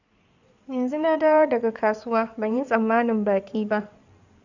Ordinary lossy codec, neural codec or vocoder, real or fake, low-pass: none; codec, 16 kHz in and 24 kHz out, 2.2 kbps, FireRedTTS-2 codec; fake; 7.2 kHz